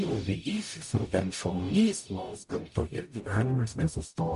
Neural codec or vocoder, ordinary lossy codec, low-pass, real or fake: codec, 44.1 kHz, 0.9 kbps, DAC; MP3, 48 kbps; 14.4 kHz; fake